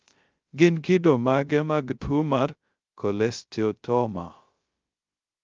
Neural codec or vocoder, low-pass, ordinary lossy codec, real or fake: codec, 16 kHz, 0.3 kbps, FocalCodec; 7.2 kHz; Opus, 32 kbps; fake